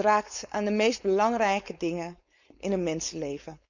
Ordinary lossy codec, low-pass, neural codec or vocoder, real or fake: none; 7.2 kHz; codec, 16 kHz, 4.8 kbps, FACodec; fake